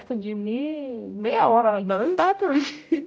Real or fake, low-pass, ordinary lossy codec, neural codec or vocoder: fake; none; none; codec, 16 kHz, 0.5 kbps, X-Codec, HuBERT features, trained on general audio